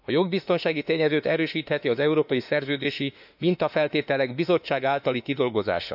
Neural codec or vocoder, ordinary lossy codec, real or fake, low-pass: autoencoder, 48 kHz, 32 numbers a frame, DAC-VAE, trained on Japanese speech; none; fake; 5.4 kHz